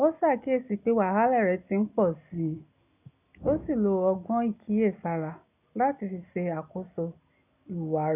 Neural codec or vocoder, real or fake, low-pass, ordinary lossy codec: none; real; 3.6 kHz; none